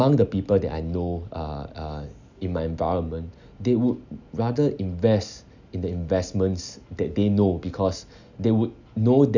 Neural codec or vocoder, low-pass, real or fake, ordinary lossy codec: none; 7.2 kHz; real; none